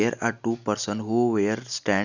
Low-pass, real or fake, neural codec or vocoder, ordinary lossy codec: 7.2 kHz; real; none; none